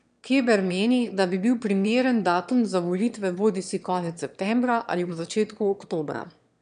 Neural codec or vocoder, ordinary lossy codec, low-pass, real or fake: autoencoder, 22.05 kHz, a latent of 192 numbers a frame, VITS, trained on one speaker; none; 9.9 kHz; fake